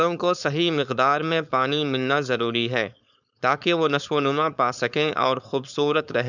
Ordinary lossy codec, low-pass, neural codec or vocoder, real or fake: none; 7.2 kHz; codec, 16 kHz, 4.8 kbps, FACodec; fake